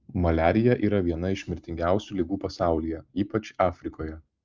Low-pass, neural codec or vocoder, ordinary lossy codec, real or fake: 7.2 kHz; none; Opus, 24 kbps; real